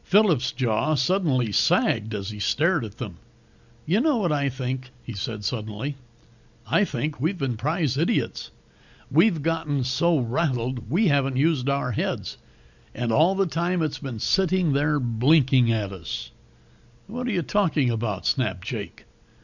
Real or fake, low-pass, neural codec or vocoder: real; 7.2 kHz; none